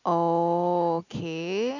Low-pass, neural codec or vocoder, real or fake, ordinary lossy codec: 7.2 kHz; none; real; none